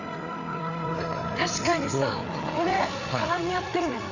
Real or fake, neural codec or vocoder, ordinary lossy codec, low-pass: fake; codec, 16 kHz, 8 kbps, FreqCodec, larger model; none; 7.2 kHz